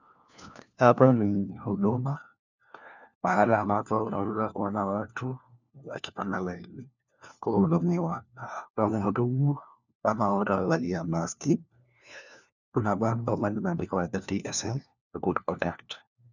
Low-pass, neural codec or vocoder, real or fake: 7.2 kHz; codec, 16 kHz, 1 kbps, FunCodec, trained on LibriTTS, 50 frames a second; fake